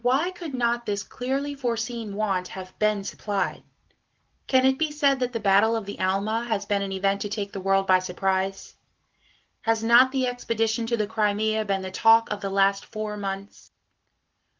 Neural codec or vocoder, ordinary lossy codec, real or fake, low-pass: none; Opus, 16 kbps; real; 7.2 kHz